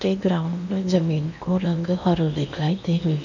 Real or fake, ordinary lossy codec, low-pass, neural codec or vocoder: fake; none; 7.2 kHz; codec, 16 kHz in and 24 kHz out, 0.8 kbps, FocalCodec, streaming, 65536 codes